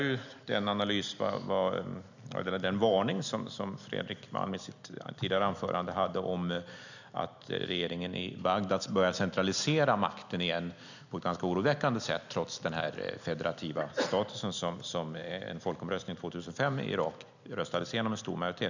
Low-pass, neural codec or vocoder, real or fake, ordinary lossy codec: 7.2 kHz; none; real; none